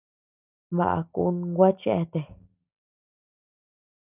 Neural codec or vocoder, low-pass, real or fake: none; 3.6 kHz; real